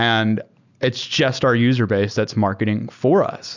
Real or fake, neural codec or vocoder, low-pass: real; none; 7.2 kHz